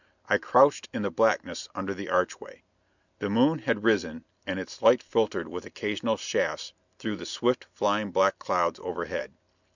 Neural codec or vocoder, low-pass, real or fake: none; 7.2 kHz; real